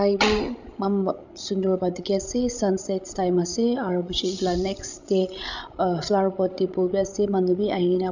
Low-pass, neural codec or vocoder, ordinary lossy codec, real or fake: 7.2 kHz; codec, 16 kHz, 16 kbps, FreqCodec, larger model; none; fake